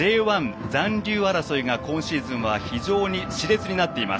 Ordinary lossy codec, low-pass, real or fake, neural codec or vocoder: none; none; real; none